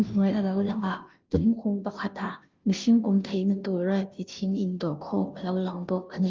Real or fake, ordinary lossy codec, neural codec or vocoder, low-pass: fake; Opus, 32 kbps; codec, 16 kHz, 0.5 kbps, FunCodec, trained on Chinese and English, 25 frames a second; 7.2 kHz